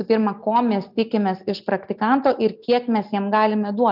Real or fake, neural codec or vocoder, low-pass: real; none; 5.4 kHz